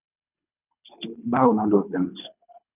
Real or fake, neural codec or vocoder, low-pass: fake; codec, 24 kHz, 3 kbps, HILCodec; 3.6 kHz